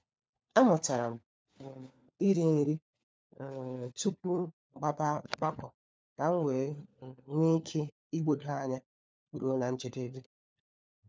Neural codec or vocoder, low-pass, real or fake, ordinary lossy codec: codec, 16 kHz, 4 kbps, FunCodec, trained on LibriTTS, 50 frames a second; none; fake; none